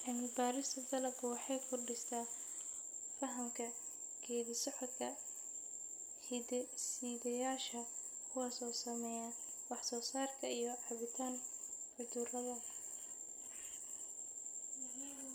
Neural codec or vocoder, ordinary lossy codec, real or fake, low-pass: none; none; real; none